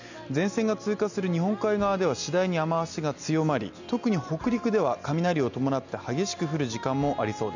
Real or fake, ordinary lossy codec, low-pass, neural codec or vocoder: real; none; 7.2 kHz; none